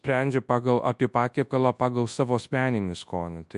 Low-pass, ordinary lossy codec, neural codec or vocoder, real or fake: 10.8 kHz; MP3, 64 kbps; codec, 24 kHz, 0.9 kbps, WavTokenizer, large speech release; fake